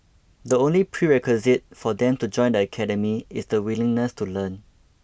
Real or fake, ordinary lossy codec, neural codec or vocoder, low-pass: real; none; none; none